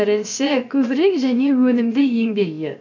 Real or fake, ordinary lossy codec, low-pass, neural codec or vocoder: fake; MP3, 64 kbps; 7.2 kHz; codec, 16 kHz, about 1 kbps, DyCAST, with the encoder's durations